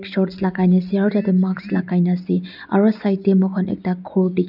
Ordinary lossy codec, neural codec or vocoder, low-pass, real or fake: none; none; 5.4 kHz; real